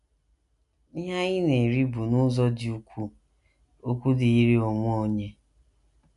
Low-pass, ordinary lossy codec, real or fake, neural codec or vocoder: 10.8 kHz; none; real; none